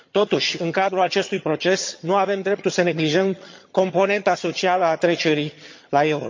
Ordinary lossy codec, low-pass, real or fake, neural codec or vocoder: MP3, 48 kbps; 7.2 kHz; fake; vocoder, 22.05 kHz, 80 mel bands, HiFi-GAN